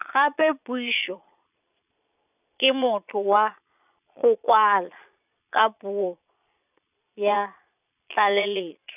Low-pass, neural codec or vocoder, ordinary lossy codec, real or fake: 3.6 kHz; vocoder, 22.05 kHz, 80 mel bands, Vocos; none; fake